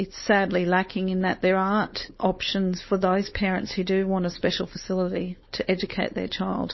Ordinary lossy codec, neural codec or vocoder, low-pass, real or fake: MP3, 24 kbps; codec, 16 kHz, 4.8 kbps, FACodec; 7.2 kHz; fake